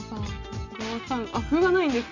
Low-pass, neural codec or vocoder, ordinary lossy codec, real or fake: 7.2 kHz; none; none; real